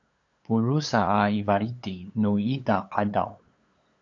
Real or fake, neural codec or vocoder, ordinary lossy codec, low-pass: fake; codec, 16 kHz, 8 kbps, FunCodec, trained on LibriTTS, 25 frames a second; AAC, 48 kbps; 7.2 kHz